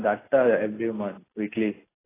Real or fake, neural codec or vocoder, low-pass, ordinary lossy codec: real; none; 3.6 kHz; AAC, 16 kbps